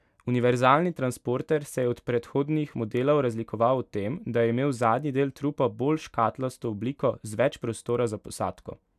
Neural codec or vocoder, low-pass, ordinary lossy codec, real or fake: none; 14.4 kHz; none; real